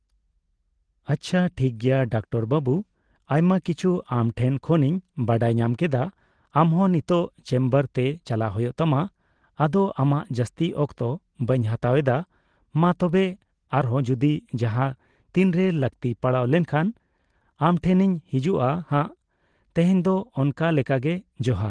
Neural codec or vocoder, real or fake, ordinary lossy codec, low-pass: none; real; Opus, 16 kbps; 9.9 kHz